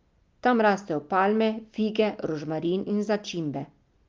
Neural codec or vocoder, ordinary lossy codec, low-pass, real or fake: none; Opus, 24 kbps; 7.2 kHz; real